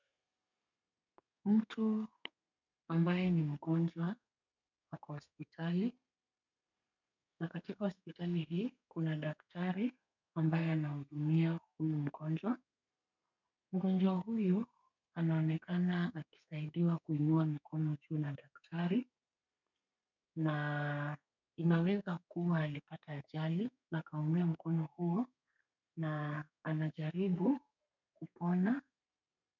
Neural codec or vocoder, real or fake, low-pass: codec, 32 kHz, 1.9 kbps, SNAC; fake; 7.2 kHz